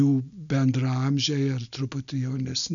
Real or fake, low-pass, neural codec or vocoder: real; 7.2 kHz; none